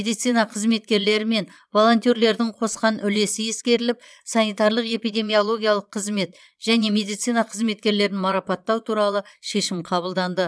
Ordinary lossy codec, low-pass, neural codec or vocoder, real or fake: none; none; vocoder, 22.05 kHz, 80 mel bands, Vocos; fake